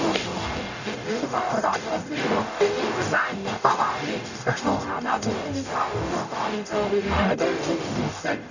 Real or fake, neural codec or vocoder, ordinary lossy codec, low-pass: fake; codec, 44.1 kHz, 0.9 kbps, DAC; none; 7.2 kHz